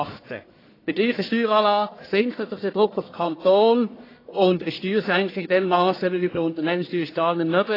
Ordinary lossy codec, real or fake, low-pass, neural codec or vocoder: AAC, 24 kbps; fake; 5.4 kHz; codec, 44.1 kHz, 1.7 kbps, Pupu-Codec